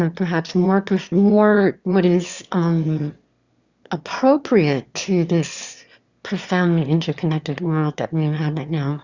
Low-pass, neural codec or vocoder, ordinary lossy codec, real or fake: 7.2 kHz; autoencoder, 22.05 kHz, a latent of 192 numbers a frame, VITS, trained on one speaker; Opus, 64 kbps; fake